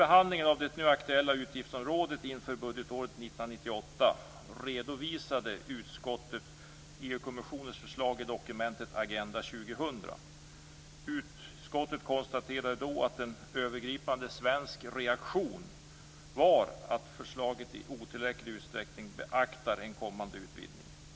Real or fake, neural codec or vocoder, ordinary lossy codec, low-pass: real; none; none; none